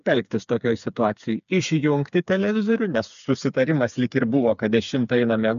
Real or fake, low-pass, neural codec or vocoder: fake; 7.2 kHz; codec, 16 kHz, 4 kbps, FreqCodec, smaller model